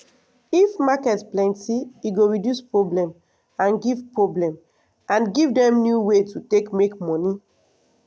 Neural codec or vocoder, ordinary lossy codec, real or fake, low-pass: none; none; real; none